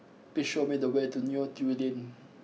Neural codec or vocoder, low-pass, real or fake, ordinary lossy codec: none; none; real; none